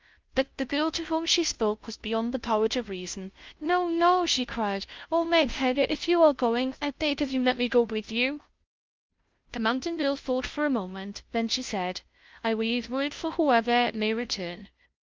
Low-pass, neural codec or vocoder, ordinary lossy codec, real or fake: 7.2 kHz; codec, 16 kHz, 0.5 kbps, FunCodec, trained on Chinese and English, 25 frames a second; Opus, 32 kbps; fake